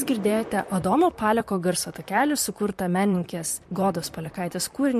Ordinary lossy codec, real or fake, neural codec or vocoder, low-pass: MP3, 64 kbps; fake; vocoder, 44.1 kHz, 128 mel bands, Pupu-Vocoder; 14.4 kHz